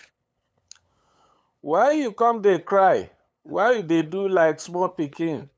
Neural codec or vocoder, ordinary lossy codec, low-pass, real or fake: codec, 16 kHz, 8 kbps, FunCodec, trained on LibriTTS, 25 frames a second; none; none; fake